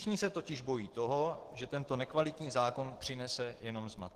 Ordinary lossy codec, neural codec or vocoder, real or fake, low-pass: Opus, 16 kbps; codec, 44.1 kHz, 7.8 kbps, DAC; fake; 14.4 kHz